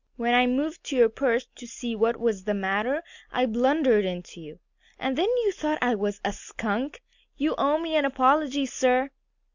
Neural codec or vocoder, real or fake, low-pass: none; real; 7.2 kHz